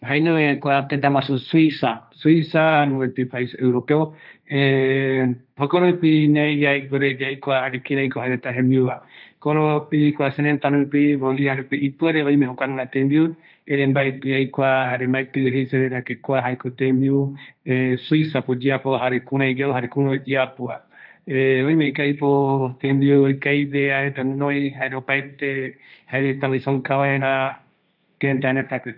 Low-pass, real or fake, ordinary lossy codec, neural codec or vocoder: 5.4 kHz; fake; none; codec, 16 kHz, 1.1 kbps, Voila-Tokenizer